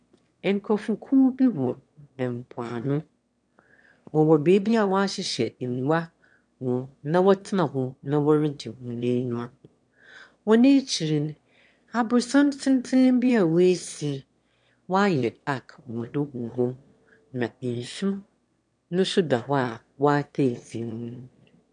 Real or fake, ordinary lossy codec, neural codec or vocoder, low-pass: fake; MP3, 64 kbps; autoencoder, 22.05 kHz, a latent of 192 numbers a frame, VITS, trained on one speaker; 9.9 kHz